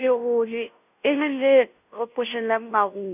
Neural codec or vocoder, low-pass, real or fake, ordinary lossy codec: codec, 16 kHz, 0.5 kbps, FunCodec, trained on Chinese and English, 25 frames a second; 3.6 kHz; fake; none